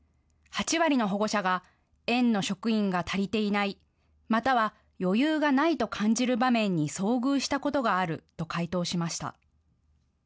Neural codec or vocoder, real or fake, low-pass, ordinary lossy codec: none; real; none; none